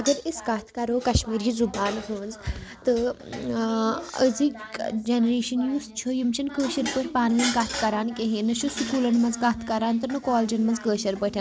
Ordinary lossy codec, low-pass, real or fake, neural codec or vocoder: none; none; real; none